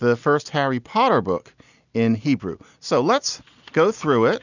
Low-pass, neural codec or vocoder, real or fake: 7.2 kHz; none; real